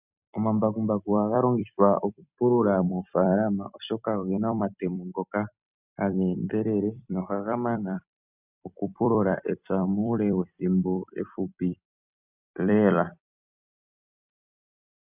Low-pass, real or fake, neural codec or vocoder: 3.6 kHz; fake; vocoder, 44.1 kHz, 128 mel bands every 256 samples, BigVGAN v2